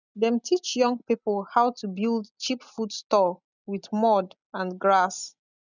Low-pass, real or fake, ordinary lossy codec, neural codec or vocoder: 7.2 kHz; real; none; none